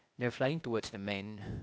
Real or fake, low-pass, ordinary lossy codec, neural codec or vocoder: fake; none; none; codec, 16 kHz, 0.8 kbps, ZipCodec